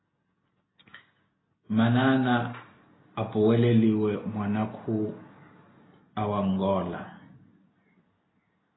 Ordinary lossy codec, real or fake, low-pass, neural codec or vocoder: AAC, 16 kbps; real; 7.2 kHz; none